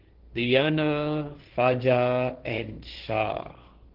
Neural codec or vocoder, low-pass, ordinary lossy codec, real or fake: codec, 16 kHz, 1.1 kbps, Voila-Tokenizer; 5.4 kHz; Opus, 16 kbps; fake